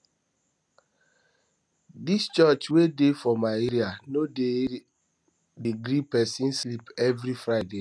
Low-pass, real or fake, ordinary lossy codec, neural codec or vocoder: none; real; none; none